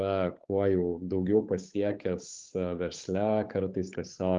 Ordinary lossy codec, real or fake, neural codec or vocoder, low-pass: Opus, 32 kbps; fake; codec, 16 kHz, 8 kbps, FunCodec, trained on Chinese and English, 25 frames a second; 7.2 kHz